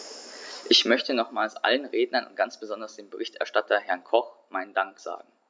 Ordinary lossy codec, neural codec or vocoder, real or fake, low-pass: none; none; real; none